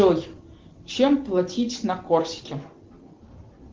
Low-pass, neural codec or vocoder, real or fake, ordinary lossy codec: 7.2 kHz; none; real; Opus, 16 kbps